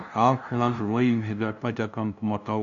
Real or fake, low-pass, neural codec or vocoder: fake; 7.2 kHz; codec, 16 kHz, 0.5 kbps, FunCodec, trained on LibriTTS, 25 frames a second